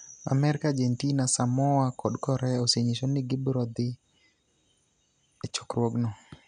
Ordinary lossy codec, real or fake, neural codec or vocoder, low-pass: none; real; none; 9.9 kHz